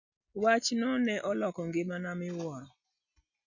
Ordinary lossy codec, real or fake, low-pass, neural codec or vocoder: none; real; 7.2 kHz; none